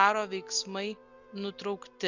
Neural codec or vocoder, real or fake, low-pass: none; real; 7.2 kHz